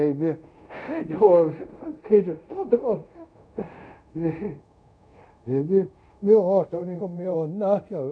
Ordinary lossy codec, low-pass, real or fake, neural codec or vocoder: none; 9.9 kHz; fake; codec, 24 kHz, 0.5 kbps, DualCodec